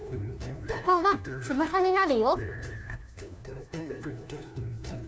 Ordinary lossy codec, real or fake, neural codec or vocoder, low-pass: none; fake; codec, 16 kHz, 1 kbps, FunCodec, trained on LibriTTS, 50 frames a second; none